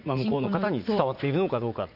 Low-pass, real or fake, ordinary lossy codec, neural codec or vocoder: 5.4 kHz; fake; none; vocoder, 44.1 kHz, 80 mel bands, Vocos